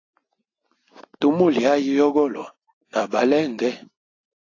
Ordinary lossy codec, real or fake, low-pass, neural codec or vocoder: AAC, 32 kbps; real; 7.2 kHz; none